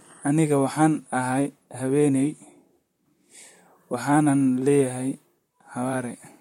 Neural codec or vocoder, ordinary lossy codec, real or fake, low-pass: vocoder, 44.1 kHz, 128 mel bands, Pupu-Vocoder; MP3, 64 kbps; fake; 19.8 kHz